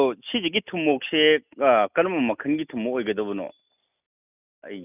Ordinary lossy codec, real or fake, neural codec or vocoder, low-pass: none; real; none; 3.6 kHz